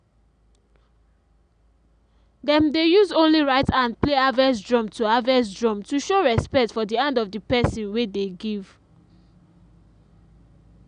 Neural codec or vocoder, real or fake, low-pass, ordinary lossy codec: none; real; 9.9 kHz; none